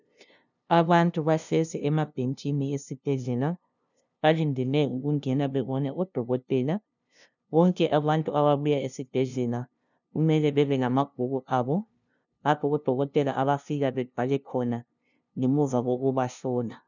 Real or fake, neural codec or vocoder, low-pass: fake; codec, 16 kHz, 0.5 kbps, FunCodec, trained on LibriTTS, 25 frames a second; 7.2 kHz